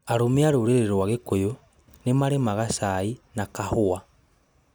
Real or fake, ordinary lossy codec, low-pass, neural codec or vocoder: real; none; none; none